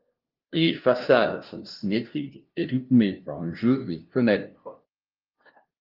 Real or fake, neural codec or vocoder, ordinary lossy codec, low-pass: fake; codec, 16 kHz, 0.5 kbps, FunCodec, trained on LibriTTS, 25 frames a second; Opus, 24 kbps; 5.4 kHz